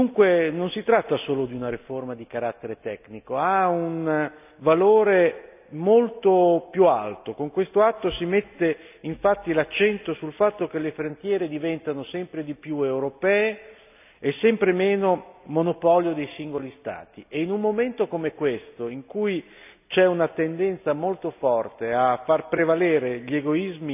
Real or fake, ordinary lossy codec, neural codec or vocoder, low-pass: real; none; none; 3.6 kHz